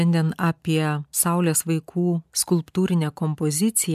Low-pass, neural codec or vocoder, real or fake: 14.4 kHz; none; real